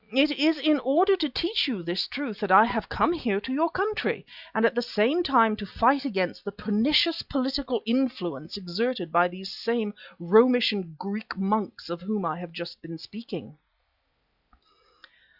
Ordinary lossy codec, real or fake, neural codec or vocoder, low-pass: Opus, 64 kbps; real; none; 5.4 kHz